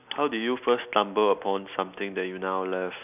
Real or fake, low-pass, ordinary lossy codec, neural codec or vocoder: real; 3.6 kHz; none; none